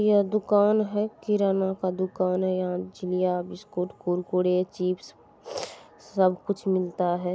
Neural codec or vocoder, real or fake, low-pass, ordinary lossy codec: none; real; none; none